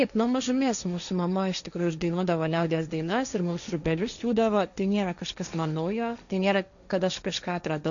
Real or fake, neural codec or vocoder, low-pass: fake; codec, 16 kHz, 1.1 kbps, Voila-Tokenizer; 7.2 kHz